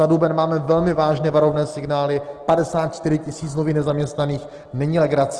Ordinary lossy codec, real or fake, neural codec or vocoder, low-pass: Opus, 16 kbps; real; none; 10.8 kHz